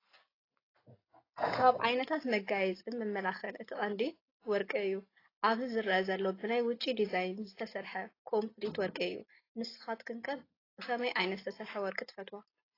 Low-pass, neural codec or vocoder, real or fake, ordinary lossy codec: 5.4 kHz; none; real; AAC, 24 kbps